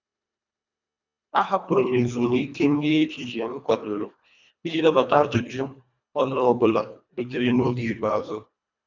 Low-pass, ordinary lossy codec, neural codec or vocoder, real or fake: 7.2 kHz; none; codec, 24 kHz, 1.5 kbps, HILCodec; fake